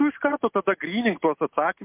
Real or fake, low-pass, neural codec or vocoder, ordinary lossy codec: real; 3.6 kHz; none; MP3, 32 kbps